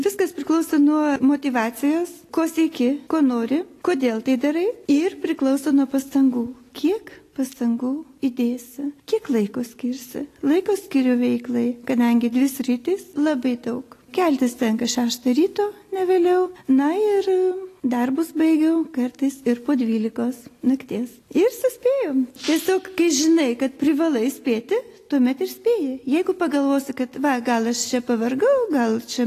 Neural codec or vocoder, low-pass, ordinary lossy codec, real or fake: none; 14.4 kHz; AAC, 48 kbps; real